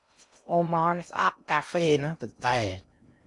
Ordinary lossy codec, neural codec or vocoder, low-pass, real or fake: MP3, 96 kbps; codec, 16 kHz in and 24 kHz out, 0.6 kbps, FocalCodec, streaming, 2048 codes; 10.8 kHz; fake